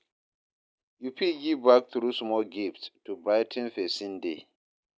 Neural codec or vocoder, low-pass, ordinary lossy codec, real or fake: none; none; none; real